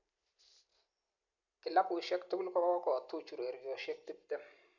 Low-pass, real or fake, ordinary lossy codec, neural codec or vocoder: 7.2 kHz; real; none; none